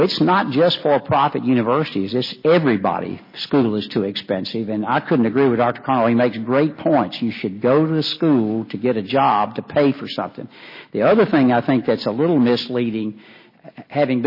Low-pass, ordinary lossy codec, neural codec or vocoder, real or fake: 5.4 kHz; MP3, 24 kbps; none; real